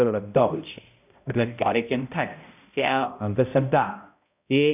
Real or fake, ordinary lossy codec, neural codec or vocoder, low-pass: fake; none; codec, 16 kHz, 0.5 kbps, X-Codec, HuBERT features, trained on balanced general audio; 3.6 kHz